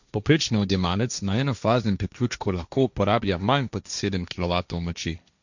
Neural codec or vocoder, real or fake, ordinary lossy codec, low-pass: codec, 16 kHz, 1.1 kbps, Voila-Tokenizer; fake; none; 7.2 kHz